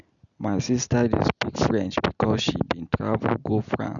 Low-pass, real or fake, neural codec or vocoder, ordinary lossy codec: 7.2 kHz; real; none; none